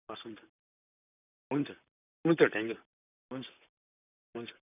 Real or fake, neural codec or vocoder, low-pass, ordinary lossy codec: fake; vocoder, 44.1 kHz, 128 mel bands, Pupu-Vocoder; 3.6 kHz; none